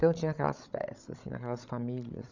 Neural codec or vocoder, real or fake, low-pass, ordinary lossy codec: codec, 16 kHz, 16 kbps, FreqCodec, larger model; fake; 7.2 kHz; none